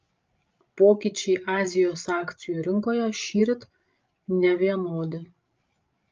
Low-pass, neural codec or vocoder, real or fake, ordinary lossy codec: 7.2 kHz; codec, 16 kHz, 16 kbps, FreqCodec, larger model; fake; Opus, 24 kbps